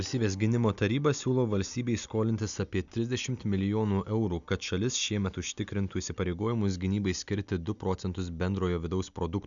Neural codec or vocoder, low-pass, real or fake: none; 7.2 kHz; real